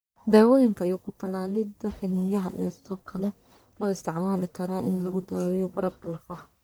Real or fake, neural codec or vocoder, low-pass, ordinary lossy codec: fake; codec, 44.1 kHz, 1.7 kbps, Pupu-Codec; none; none